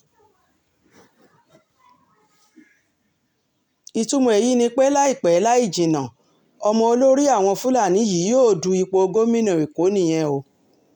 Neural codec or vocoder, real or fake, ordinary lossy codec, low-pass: none; real; none; 19.8 kHz